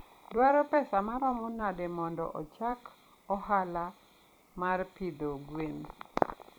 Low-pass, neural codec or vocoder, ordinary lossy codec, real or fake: none; vocoder, 44.1 kHz, 128 mel bands every 256 samples, BigVGAN v2; none; fake